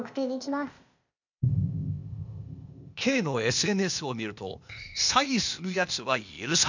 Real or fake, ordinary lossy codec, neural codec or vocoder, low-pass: fake; none; codec, 16 kHz, 0.8 kbps, ZipCodec; 7.2 kHz